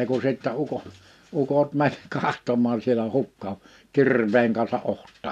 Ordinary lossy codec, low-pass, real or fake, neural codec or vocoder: none; 14.4 kHz; real; none